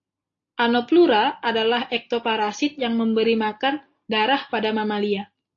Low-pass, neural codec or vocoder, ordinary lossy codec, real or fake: 7.2 kHz; none; AAC, 48 kbps; real